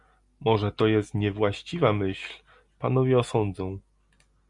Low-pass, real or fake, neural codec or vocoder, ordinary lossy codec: 10.8 kHz; real; none; Opus, 64 kbps